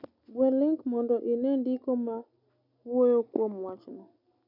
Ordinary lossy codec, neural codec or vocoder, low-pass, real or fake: none; none; 5.4 kHz; real